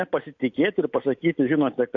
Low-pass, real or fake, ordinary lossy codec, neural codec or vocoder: 7.2 kHz; real; AAC, 48 kbps; none